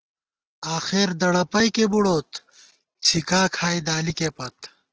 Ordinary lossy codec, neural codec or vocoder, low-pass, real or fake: Opus, 16 kbps; none; 7.2 kHz; real